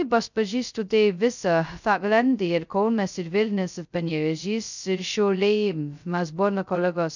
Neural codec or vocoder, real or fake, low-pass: codec, 16 kHz, 0.2 kbps, FocalCodec; fake; 7.2 kHz